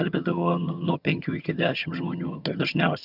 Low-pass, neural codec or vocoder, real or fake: 5.4 kHz; vocoder, 22.05 kHz, 80 mel bands, HiFi-GAN; fake